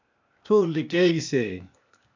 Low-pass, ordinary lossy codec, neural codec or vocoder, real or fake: 7.2 kHz; MP3, 64 kbps; codec, 16 kHz, 0.8 kbps, ZipCodec; fake